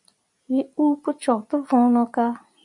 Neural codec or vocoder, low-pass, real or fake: none; 10.8 kHz; real